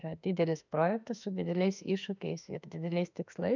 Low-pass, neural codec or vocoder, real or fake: 7.2 kHz; autoencoder, 48 kHz, 32 numbers a frame, DAC-VAE, trained on Japanese speech; fake